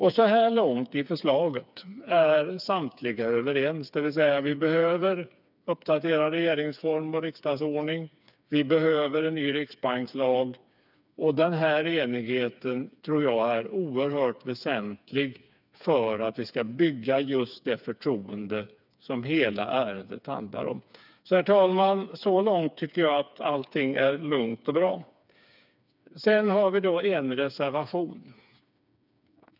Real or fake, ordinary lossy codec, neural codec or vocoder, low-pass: fake; none; codec, 16 kHz, 4 kbps, FreqCodec, smaller model; 5.4 kHz